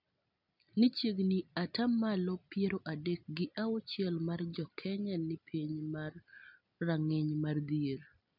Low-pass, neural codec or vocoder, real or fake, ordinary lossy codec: 5.4 kHz; none; real; none